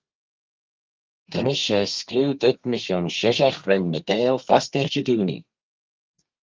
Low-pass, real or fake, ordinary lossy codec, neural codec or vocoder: 7.2 kHz; fake; Opus, 32 kbps; codec, 32 kHz, 1.9 kbps, SNAC